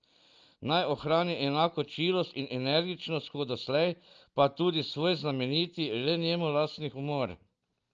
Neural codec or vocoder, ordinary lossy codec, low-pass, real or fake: none; Opus, 24 kbps; 7.2 kHz; real